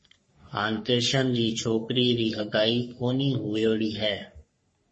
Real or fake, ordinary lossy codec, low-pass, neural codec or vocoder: fake; MP3, 32 kbps; 10.8 kHz; codec, 44.1 kHz, 3.4 kbps, Pupu-Codec